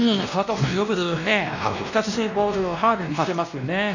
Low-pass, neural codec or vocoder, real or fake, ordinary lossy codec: 7.2 kHz; codec, 16 kHz, 1 kbps, X-Codec, WavLM features, trained on Multilingual LibriSpeech; fake; none